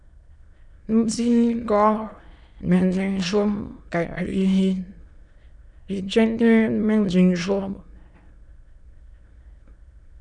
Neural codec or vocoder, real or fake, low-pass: autoencoder, 22.05 kHz, a latent of 192 numbers a frame, VITS, trained on many speakers; fake; 9.9 kHz